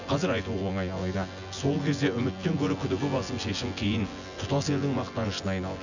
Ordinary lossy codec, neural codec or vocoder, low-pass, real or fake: none; vocoder, 24 kHz, 100 mel bands, Vocos; 7.2 kHz; fake